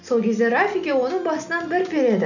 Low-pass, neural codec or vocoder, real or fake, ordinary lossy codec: 7.2 kHz; none; real; none